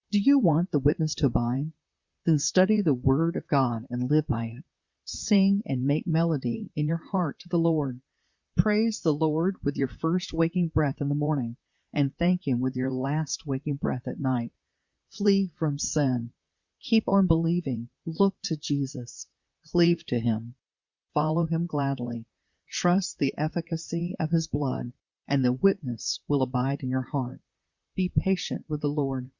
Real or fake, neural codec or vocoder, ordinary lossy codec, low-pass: fake; vocoder, 44.1 kHz, 128 mel bands, Pupu-Vocoder; Opus, 64 kbps; 7.2 kHz